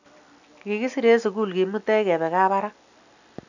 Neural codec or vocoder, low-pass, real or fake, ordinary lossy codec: none; 7.2 kHz; real; none